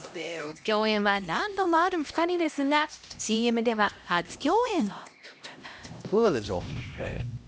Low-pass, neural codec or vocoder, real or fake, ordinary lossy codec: none; codec, 16 kHz, 1 kbps, X-Codec, HuBERT features, trained on LibriSpeech; fake; none